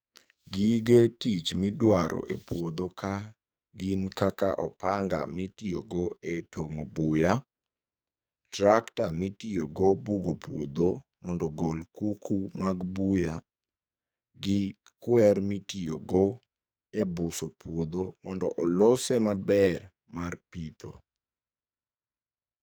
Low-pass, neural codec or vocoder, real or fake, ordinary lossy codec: none; codec, 44.1 kHz, 2.6 kbps, SNAC; fake; none